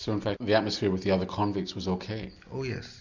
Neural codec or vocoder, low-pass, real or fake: none; 7.2 kHz; real